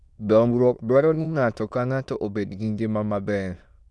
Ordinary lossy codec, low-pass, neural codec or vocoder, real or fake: none; none; autoencoder, 22.05 kHz, a latent of 192 numbers a frame, VITS, trained on many speakers; fake